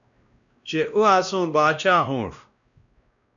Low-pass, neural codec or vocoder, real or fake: 7.2 kHz; codec, 16 kHz, 1 kbps, X-Codec, WavLM features, trained on Multilingual LibriSpeech; fake